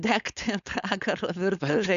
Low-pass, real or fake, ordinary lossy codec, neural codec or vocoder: 7.2 kHz; fake; AAC, 96 kbps; codec, 16 kHz, 4.8 kbps, FACodec